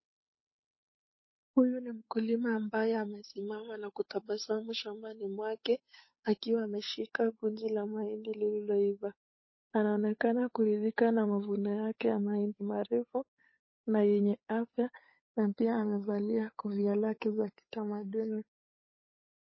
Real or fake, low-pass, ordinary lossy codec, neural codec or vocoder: fake; 7.2 kHz; MP3, 24 kbps; codec, 16 kHz, 8 kbps, FunCodec, trained on Chinese and English, 25 frames a second